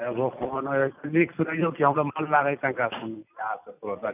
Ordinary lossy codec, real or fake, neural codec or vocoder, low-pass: none; real; none; 3.6 kHz